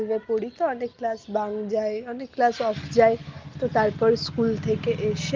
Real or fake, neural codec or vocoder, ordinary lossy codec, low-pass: real; none; Opus, 24 kbps; 7.2 kHz